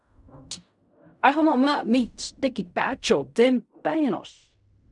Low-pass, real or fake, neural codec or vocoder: 10.8 kHz; fake; codec, 16 kHz in and 24 kHz out, 0.4 kbps, LongCat-Audio-Codec, fine tuned four codebook decoder